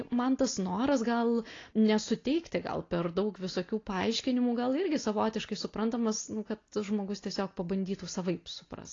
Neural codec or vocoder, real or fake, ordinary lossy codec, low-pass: none; real; AAC, 32 kbps; 7.2 kHz